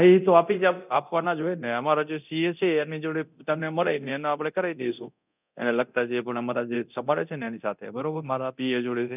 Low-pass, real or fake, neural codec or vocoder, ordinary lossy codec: 3.6 kHz; fake; codec, 24 kHz, 0.9 kbps, DualCodec; none